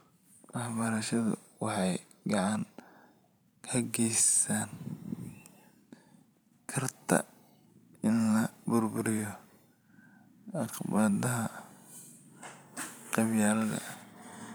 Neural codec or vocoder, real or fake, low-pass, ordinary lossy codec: vocoder, 44.1 kHz, 128 mel bands every 512 samples, BigVGAN v2; fake; none; none